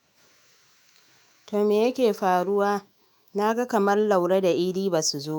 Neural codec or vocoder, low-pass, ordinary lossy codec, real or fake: autoencoder, 48 kHz, 128 numbers a frame, DAC-VAE, trained on Japanese speech; none; none; fake